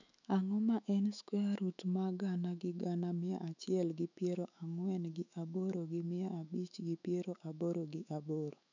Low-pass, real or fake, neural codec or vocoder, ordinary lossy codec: 7.2 kHz; fake; autoencoder, 48 kHz, 128 numbers a frame, DAC-VAE, trained on Japanese speech; none